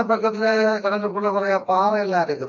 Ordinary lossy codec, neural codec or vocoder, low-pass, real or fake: MP3, 64 kbps; codec, 16 kHz, 2 kbps, FreqCodec, smaller model; 7.2 kHz; fake